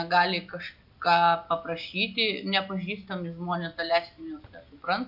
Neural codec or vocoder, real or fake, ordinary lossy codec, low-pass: none; real; AAC, 48 kbps; 5.4 kHz